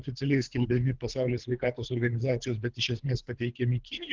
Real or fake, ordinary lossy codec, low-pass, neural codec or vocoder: fake; Opus, 16 kbps; 7.2 kHz; codec, 24 kHz, 3 kbps, HILCodec